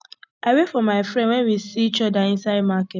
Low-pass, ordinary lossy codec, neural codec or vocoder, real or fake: none; none; none; real